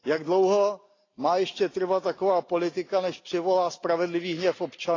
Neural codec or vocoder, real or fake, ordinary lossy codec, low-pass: none; real; AAC, 32 kbps; 7.2 kHz